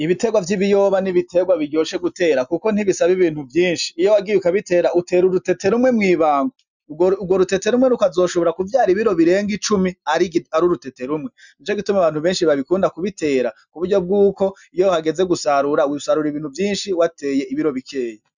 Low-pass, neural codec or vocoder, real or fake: 7.2 kHz; none; real